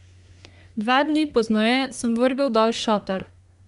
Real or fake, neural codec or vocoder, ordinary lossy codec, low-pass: fake; codec, 24 kHz, 1 kbps, SNAC; none; 10.8 kHz